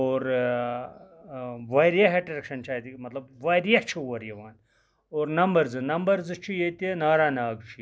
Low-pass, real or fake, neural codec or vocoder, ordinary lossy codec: none; real; none; none